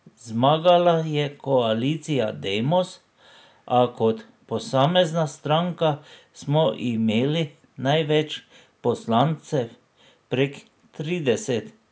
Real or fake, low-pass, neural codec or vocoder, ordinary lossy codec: real; none; none; none